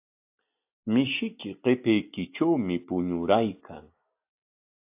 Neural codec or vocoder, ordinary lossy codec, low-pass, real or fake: none; AAC, 32 kbps; 3.6 kHz; real